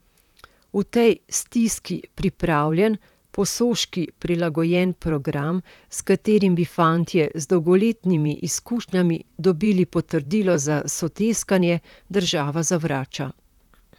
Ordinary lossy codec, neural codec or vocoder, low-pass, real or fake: none; vocoder, 44.1 kHz, 128 mel bands, Pupu-Vocoder; 19.8 kHz; fake